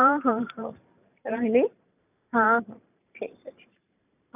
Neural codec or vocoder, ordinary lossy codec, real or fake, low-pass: vocoder, 44.1 kHz, 128 mel bands every 512 samples, BigVGAN v2; none; fake; 3.6 kHz